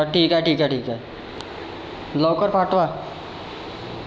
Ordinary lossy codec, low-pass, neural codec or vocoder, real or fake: none; none; none; real